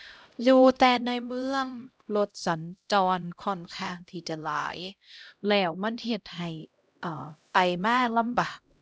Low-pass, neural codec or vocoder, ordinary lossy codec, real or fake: none; codec, 16 kHz, 0.5 kbps, X-Codec, HuBERT features, trained on LibriSpeech; none; fake